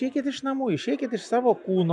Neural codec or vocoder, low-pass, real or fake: none; 10.8 kHz; real